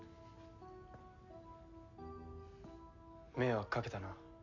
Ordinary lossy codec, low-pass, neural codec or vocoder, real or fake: none; 7.2 kHz; none; real